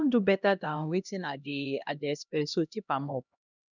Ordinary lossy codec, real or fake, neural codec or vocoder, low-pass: none; fake; codec, 16 kHz, 1 kbps, X-Codec, HuBERT features, trained on LibriSpeech; 7.2 kHz